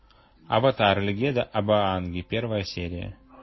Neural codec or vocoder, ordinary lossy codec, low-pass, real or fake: none; MP3, 24 kbps; 7.2 kHz; real